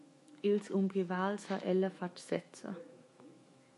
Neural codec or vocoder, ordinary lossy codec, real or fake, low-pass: autoencoder, 48 kHz, 128 numbers a frame, DAC-VAE, trained on Japanese speech; MP3, 48 kbps; fake; 14.4 kHz